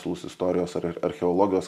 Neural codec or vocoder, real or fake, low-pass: none; real; 14.4 kHz